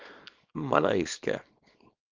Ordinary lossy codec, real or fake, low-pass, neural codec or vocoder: Opus, 32 kbps; fake; 7.2 kHz; codec, 24 kHz, 0.9 kbps, WavTokenizer, small release